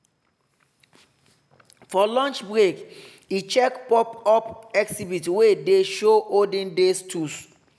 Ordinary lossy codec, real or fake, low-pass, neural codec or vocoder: none; real; none; none